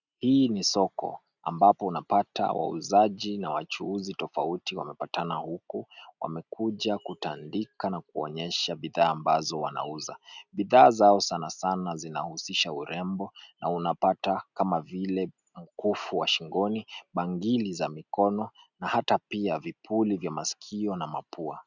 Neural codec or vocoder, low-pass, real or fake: none; 7.2 kHz; real